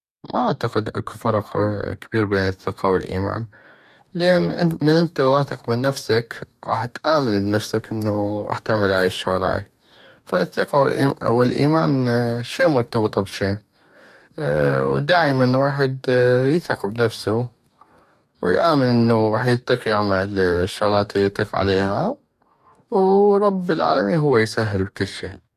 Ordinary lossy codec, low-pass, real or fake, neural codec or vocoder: none; 14.4 kHz; fake; codec, 44.1 kHz, 2.6 kbps, DAC